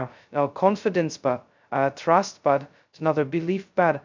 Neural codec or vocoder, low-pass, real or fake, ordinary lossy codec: codec, 16 kHz, 0.2 kbps, FocalCodec; 7.2 kHz; fake; MP3, 64 kbps